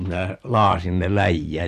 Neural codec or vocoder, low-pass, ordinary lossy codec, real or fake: vocoder, 44.1 kHz, 128 mel bands every 256 samples, BigVGAN v2; 14.4 kHz; none; fake